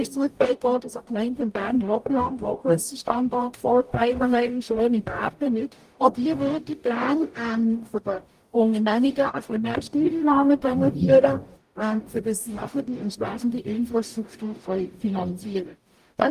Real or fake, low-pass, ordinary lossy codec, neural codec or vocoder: fake; 14.4 kHz; Opus, 32 kbps; codec, 44.1 kHz, 0.9 kbps, DAC